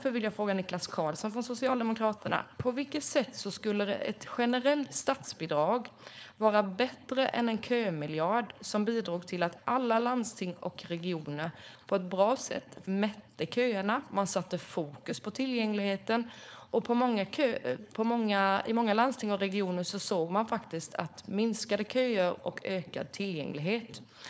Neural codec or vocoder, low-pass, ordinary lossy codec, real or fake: codec, 16 kHz, 4.8 kbps, FACodec; none; none; fake